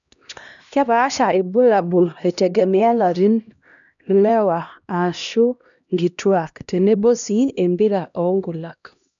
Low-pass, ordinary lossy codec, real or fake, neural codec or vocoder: 7.2 kHz; none; fake; codec, 16 kHz, 1 kbps, X-Codec, HuBERT features, trained on LibriSpeech